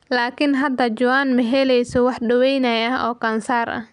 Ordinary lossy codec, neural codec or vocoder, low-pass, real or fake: none; none; 10.8 kHz; real